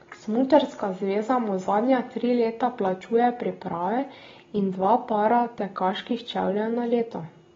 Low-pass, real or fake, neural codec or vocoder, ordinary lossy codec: 7.2 kHz; real; none; AAC, 24 kbps